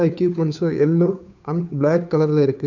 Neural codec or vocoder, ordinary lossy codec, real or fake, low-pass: codec, 16 kHz, 2 kbps, FunCodec, trained on Chinese and English, 25 frames a second; none; fake; 7.2 kHz